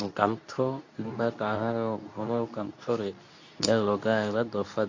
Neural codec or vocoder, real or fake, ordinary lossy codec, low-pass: codec, 24 kHz, 0.9 kbps, WavTokenizer, medium speech release version 2; fake; AAC, 48 kbps; 7.2 kHz